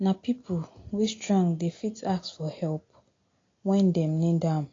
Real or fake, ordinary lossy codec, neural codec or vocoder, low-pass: real; AAC, 32 kbps; none; 7.2 kHz